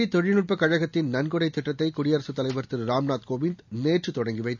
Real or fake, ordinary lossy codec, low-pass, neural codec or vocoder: real; none; 7.2 kHz; none